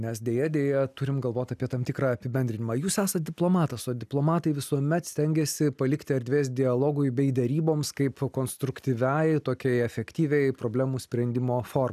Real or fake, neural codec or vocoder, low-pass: real; none; 14.4 kHz